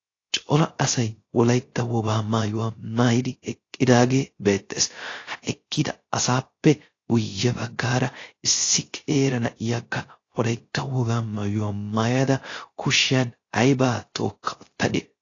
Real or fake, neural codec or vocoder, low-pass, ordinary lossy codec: fake; codec, 16 kHz, 0.3 kbps, FocalCodec; 7.2 kHz; AAC, 32 kbps